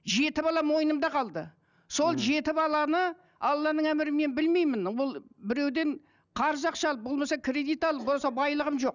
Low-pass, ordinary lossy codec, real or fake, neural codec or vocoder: 7.2 kHz; none; real; none